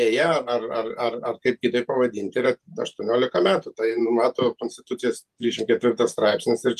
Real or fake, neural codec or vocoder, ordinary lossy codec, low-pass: fake; vocoder, 48 kHz, 128 mel bands, Vocos; Opus, 32 kbps; 14.4 kHz